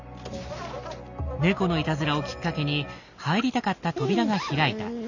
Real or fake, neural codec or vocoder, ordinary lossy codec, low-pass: real; none; none; 7.2 kHz